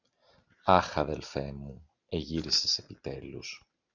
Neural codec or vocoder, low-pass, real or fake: none; 7.2 kHz; real